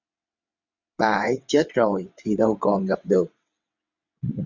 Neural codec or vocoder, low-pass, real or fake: vocoder, 22.05 kHz, 80 mel bands, WaveNeXt; 7.2 kHz; fake